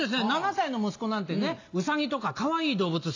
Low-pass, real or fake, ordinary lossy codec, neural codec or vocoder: 7.2 kHz; real; AAC, 48 kbps; none